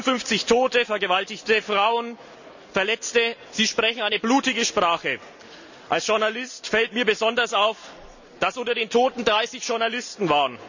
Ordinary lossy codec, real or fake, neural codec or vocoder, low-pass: none; real; none; 7.2 kHz